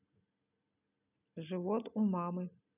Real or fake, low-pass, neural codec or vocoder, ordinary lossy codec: real; 3.6 kHz; none; none